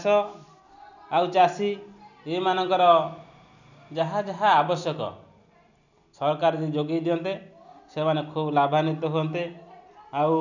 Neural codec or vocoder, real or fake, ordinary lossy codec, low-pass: none; real; none; 7.2 kHz